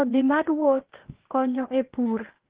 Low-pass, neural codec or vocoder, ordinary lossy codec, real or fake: 3.6 kHz; codec, 16 kHz, 0.8 kbps, ZipCodec; Opus, 16 kbps; fake